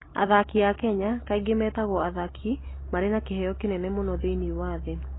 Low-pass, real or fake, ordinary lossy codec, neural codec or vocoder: 7.2 kHz; real; AAC, 16 kbps; none